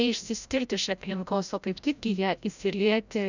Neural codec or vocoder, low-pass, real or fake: codec, 16 kHz, 0.5 kbps, FreqCodec, larger model; 7.2 kHz; fake